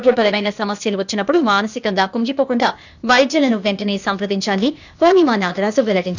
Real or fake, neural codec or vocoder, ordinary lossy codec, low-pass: fake; codec, 16 kHz, 0.8 kbps, ZipCodec; none; 7.2 kHz